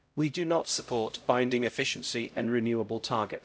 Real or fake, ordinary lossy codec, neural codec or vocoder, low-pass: fake; none; codec, 16 kHz, 0.5 kbps, X-Codec, HuBERT features, trained on LibriSpeech; none